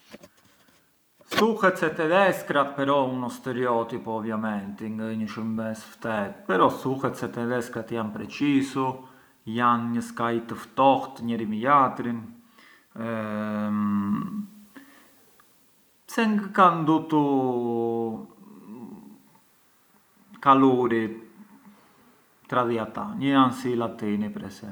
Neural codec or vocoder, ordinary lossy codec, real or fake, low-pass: none; none; real; none